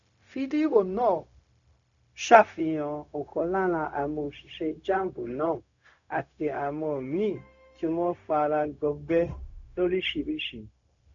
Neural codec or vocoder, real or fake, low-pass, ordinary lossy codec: codec, 16 kHz, 0.4 kbps, LongCat-Audio-Codec; fake; 7.2 kHz; none